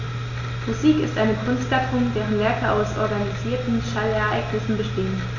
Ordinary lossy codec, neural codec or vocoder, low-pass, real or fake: none; none; 7.2 kHz; real